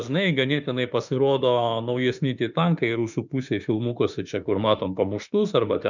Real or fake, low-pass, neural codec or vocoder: fake; 7.2 kHz; autoencoder, 48 kHz, 32 numbers a frame, DAC-VAE, trained on Japanese speech